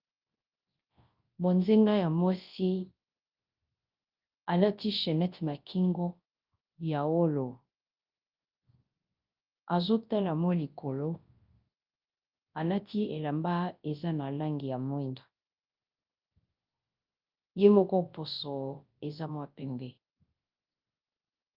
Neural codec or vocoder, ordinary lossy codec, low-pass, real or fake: codec, 24 kHz, 0.9 kbps, WavTokenizer, large speech release; Opus, 24 kbps; 5.4 kHz; fake